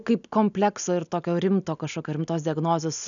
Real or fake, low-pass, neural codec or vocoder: real; 7.2 kHz; none